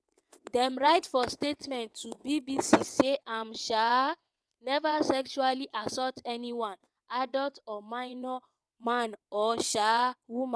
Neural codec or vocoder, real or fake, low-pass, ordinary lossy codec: vocoder, 22.05 kHz, 80 mel bands, WaveNeXt; fake; none; none